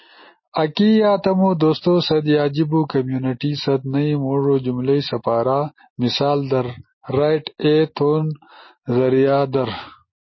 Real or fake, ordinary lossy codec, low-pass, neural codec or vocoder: real; MP3, 24 kbps; 7.2 kHz; none